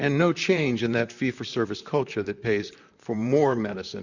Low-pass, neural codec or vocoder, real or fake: 7.2 kHz; vocoder, 44.1 kHz, 128 mel bands, Pupu-Vocoder; fake